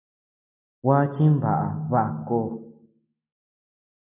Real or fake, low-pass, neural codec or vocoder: real; 3.6 kHz; none